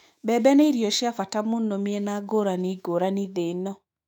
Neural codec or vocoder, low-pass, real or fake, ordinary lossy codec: autoencoder, 48 kHz, 128 numbers a frame, DAC-VAE, trained on Japanese speech; 19.8 kHz; fake; none